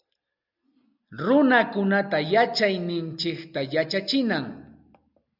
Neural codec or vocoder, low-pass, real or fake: none; 5.4 kHz; real